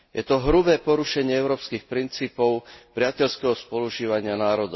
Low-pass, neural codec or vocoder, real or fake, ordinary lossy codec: 7.2 kHz; none; real; MP3, 24 kbps